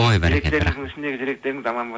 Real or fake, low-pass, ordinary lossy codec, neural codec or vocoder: real; none; none; none